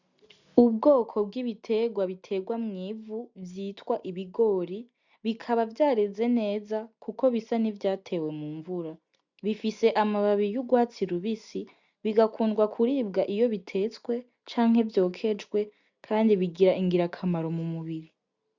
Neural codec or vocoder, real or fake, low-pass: none; real; 7.2 kHz